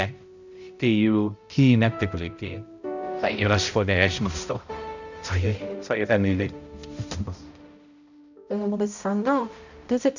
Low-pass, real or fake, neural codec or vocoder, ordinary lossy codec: 7.2 kHz; fake; codec, 16 kHz, 0.5 kbps, X-Codec, HuBERT features, trained on balanced general audio; none